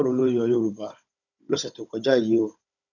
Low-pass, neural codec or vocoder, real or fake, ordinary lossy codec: 7.2 kHz; codec, 16 kHz, 16 kbps, FunCodec, trained on Chinese and English, 50 frames a second; fake; none